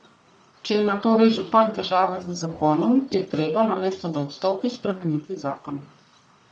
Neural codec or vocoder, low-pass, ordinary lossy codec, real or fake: codec, 44.1 kHz, 1.7 kbps, Pupu-Codec; 9.9 kHz; none; fake